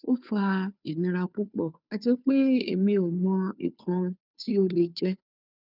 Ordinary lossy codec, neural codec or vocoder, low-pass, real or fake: none; codec, 16 kHz, 2 kbps, FunCodec, trained on Chinese and English, 25 frames a second; 5.4 kHz; fake